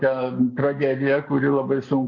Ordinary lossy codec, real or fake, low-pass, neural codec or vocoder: MP3, 48 kbps; real; 7.2 kHz; none